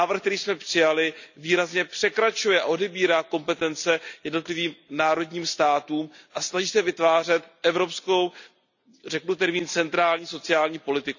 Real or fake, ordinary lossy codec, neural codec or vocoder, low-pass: real; none; none; 7.2 kHz